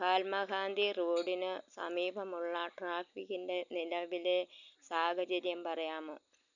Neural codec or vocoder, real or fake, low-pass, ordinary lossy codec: none; real; 7.2 kHz; none